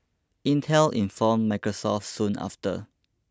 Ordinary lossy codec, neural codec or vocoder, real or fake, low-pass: none; none; real; none